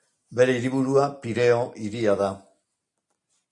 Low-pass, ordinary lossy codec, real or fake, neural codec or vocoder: 10.8 kHz; MP3, 48 kbps; real; none